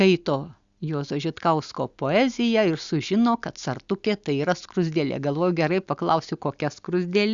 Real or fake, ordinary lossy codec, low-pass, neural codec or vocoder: real; Opus, 64 kbps; 7.2 kHz; none